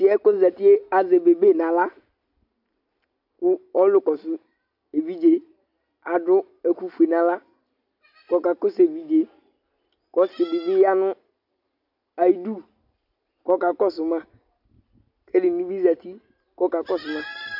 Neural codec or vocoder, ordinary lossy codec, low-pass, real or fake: none; AAC, 48 kbps; 5.4 kHz; real